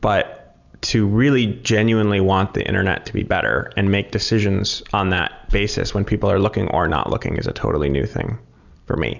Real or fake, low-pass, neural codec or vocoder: real; 7.2 kHz; none